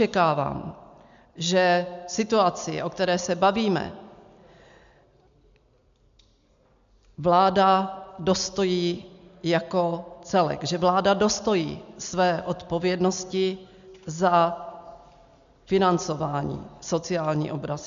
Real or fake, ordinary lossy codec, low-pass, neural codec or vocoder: real; MP3, 64 kbps; 7.2 kHz; none